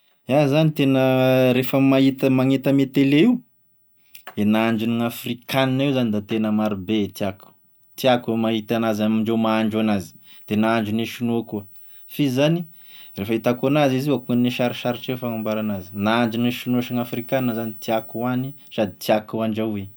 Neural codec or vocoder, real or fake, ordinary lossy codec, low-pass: none; real; none; none